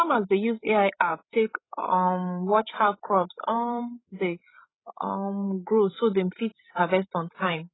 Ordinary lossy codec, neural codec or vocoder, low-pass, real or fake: AAC, 16 kbps; codec, 16 kHz, 16 kbps, FreqCodec, larger model; 7.2 kHz; fake